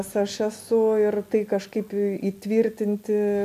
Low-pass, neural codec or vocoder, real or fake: 14.4 kHz; none; real